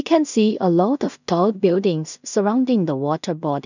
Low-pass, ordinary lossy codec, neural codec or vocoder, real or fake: 7.2 kHz; none; codec, 16 kHz in and 24 kHz out, 0.4 kbps, LongCat-Audio-Codec, two codebook decoder; fake